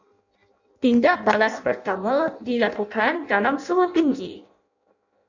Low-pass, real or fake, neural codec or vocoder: 7.2 kHz; fake; codec, 16 kHz in and 24 kHz out, 0.6 kbps, FireRedTTS-2 codec